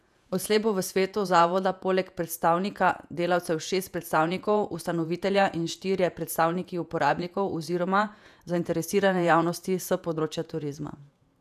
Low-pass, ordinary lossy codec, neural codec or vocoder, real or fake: 14.4 kHz; none; vocoder, 48 kHz, 128 mel bands, Vocos; fake